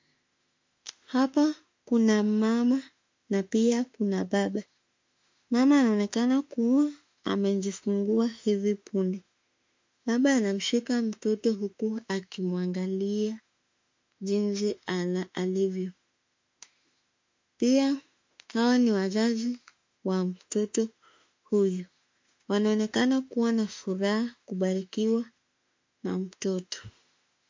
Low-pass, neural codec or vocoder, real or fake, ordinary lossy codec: 7.2 kHz; autoencoder, 48 kHz, 32 numbers a frame, DAC-VAE, trained on Japanese speech; fake; MP3, 48 kbps